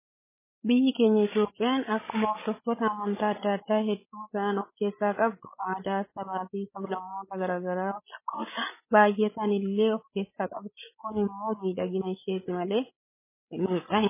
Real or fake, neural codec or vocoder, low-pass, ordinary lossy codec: fake; codec, 16 kHz, 16 kbps, FreqCodec, larger model; 3.6 kHz; MP3, 16 kbps